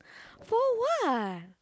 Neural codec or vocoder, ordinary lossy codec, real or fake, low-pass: none; none; real; none